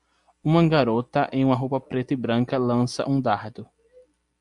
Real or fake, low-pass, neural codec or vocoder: real; 10.8 kHz; none